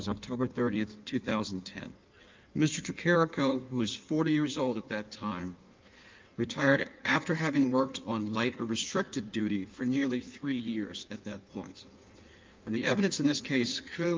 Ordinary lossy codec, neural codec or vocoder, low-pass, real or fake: Opus, 24 kbps; codec, 16 kHz in and 24 kHz out, 1.1 kbps, FireRedTTS-2 codec; 7.2 kHz; fake